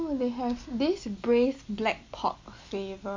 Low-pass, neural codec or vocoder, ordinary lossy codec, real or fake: 7.2 kHz; none; MP3, 48 kbps; real